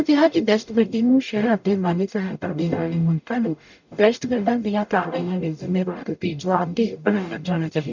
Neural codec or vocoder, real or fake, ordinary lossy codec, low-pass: codec, 44.1 kHz, 0.9 kbps, DAC; fake; none; 7.2 kHz